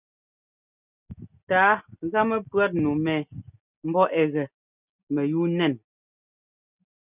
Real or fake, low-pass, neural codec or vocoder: real; 3.6 kHz; none